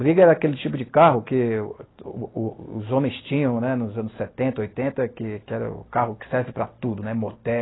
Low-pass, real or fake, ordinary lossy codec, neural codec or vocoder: 7.2 kHz; real; AAC, 16 kbps; none